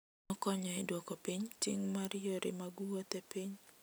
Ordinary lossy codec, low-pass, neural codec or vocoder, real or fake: none; none; none; real